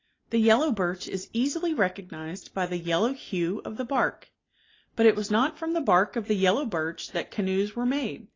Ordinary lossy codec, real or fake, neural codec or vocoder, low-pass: AAC, 32 kbps; real; none; 7.2 kHz